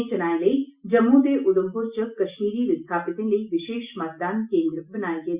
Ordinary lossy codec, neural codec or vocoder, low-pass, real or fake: Opus, 64 kbps; none; 3.6 kHz; real